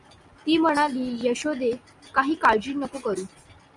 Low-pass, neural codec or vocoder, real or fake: 10.8 kHz; none; real